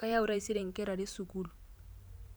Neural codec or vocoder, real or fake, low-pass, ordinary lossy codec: vocoder, 44.1 kHz, 128 mel bands every 512 samples, BigVGAN v2; fake; none; none